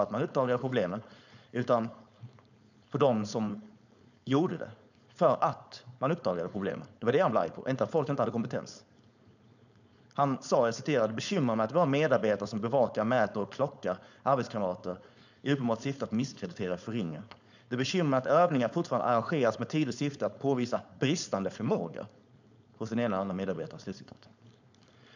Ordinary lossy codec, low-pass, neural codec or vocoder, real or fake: none; 7.2 kHz; codec, 16 kHz, 4.8 kbps, FACodec; fake